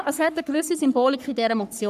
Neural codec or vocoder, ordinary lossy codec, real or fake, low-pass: codec, 44.1 kHz, 3.4 kbps, Pupu-Codec; none; fake; 14.4 kHz